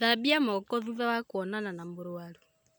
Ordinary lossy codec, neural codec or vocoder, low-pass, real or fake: none; none; none; real